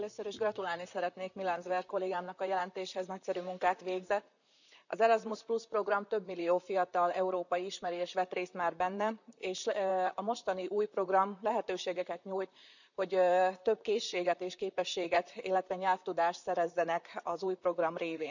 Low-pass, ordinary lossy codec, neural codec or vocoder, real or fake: 7.2 kHz; none; vocoder, 44.1 kHz, 128 mel bands, Pupu-Vocoder; fake